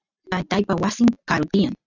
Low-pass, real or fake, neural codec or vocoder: 7.2 kHz; real; none